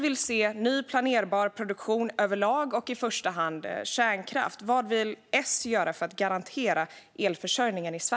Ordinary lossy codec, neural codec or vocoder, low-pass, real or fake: none; none; none; real